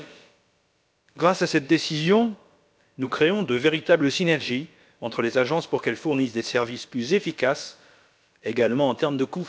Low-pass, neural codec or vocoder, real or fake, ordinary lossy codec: none; codec, 16 kHz, about 1 kbps, DyCAST, with the encoder's durations; fake; none